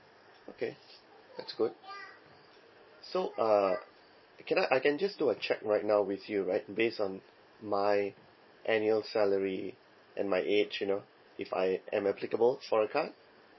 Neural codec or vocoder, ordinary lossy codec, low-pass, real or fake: none; MP3, 24 kbps; 7.2 kHz; real